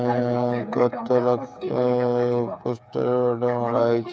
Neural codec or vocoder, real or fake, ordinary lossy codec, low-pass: codec, 16 kHz, 8 kbps, FreqCodec, smaller model; fake; none; none